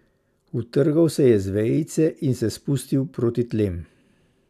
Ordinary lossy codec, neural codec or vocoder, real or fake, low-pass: none; none; real; 14.4 kHz